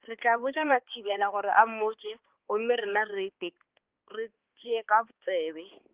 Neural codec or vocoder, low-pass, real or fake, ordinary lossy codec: codec, 16 kHz, 2 kbps, X-Codec, HuBERT features, trained on balanced general audio; 3.6 kHz; fake; Opus, 16 kbps